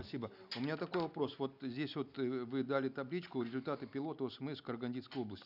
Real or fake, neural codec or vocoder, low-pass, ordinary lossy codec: real; none; 5.4 kHz; none